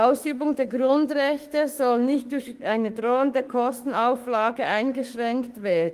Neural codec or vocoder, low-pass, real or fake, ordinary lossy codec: autoencoder, 48 kHz, 32 numbers a frame, DAC-VAE, trained on Japanese speech; 14.4 kHz; fake; Opus, 24 kbps